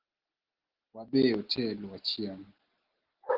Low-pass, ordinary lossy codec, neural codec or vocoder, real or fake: 5.4 kHz; Opus, 16 kbps; none; real